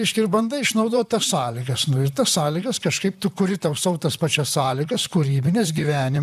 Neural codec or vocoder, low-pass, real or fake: vocoder, 44.1 kHz, 128 mel bands, Pupu-Vocoder; 14.4 kHz; fake